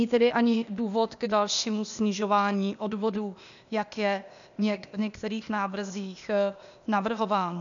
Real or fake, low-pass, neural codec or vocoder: fake; 7.2 kHz; codec, 16 kHz, 0.8 kbps, ZipCodec